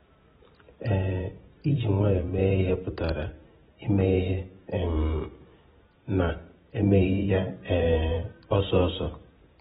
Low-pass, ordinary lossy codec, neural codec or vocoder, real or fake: 19.8 kHz; AAC, 16 kbps; vocoder, 44.1 kHz, 128 mel bands every 512 samples, BigVGAN v2; fake